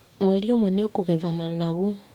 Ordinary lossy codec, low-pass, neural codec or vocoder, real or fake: none; 19.8 kHz; codec, 44.1 kHz, 2.6 kbps, DAC; fake